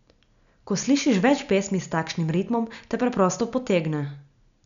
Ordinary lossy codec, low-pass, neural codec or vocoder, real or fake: none; 7.2 kHz; none; real